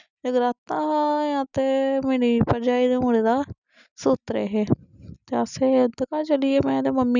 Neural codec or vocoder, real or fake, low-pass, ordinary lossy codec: none; real; 7.2 kHz; none